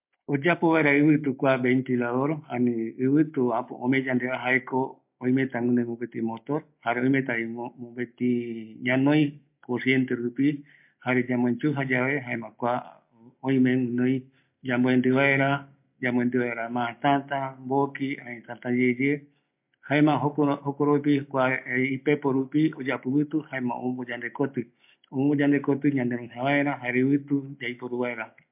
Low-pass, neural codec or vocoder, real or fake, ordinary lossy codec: 3.6 kHz; none; real; MP3, 32 kbps